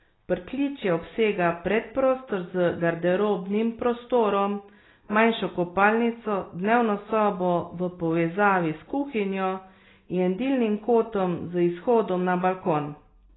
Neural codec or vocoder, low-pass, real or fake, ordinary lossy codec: none; 7.2 kHz; real; AAC, 16 kbps